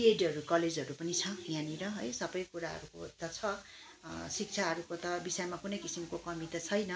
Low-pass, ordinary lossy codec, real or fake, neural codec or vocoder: none; none; real; none